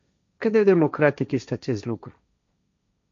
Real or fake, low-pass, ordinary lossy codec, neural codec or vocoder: fake; 7.2 kHz; MP3, 64 kbps; codec, 16 kHz, 1.1 kbps, Voila-Tokenizer